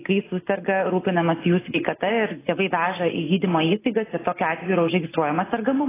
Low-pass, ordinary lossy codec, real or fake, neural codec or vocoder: 3.6 kHz; AAC, 16 kbps; real; none